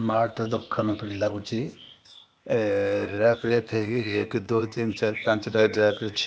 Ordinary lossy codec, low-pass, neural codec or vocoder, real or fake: none; none; codec, 16 kHz, 0.8 kbps, ZipCodec; fake